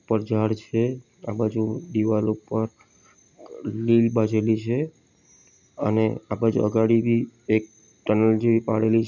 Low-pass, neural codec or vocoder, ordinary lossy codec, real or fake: 7.2 kHz; none; none; real